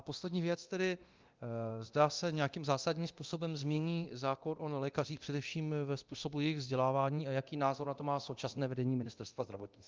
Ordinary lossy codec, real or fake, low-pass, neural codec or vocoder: Opus, 32 kbps; fake; 7.2 kHz; codec, 24 kHz, 0.9 kbps, DualCodec